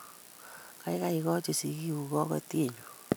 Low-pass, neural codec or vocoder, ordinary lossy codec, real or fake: none; none; none; real